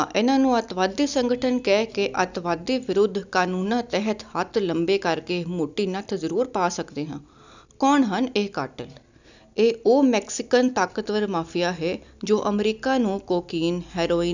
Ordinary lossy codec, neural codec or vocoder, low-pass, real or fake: none; none; 7.2 kHz; real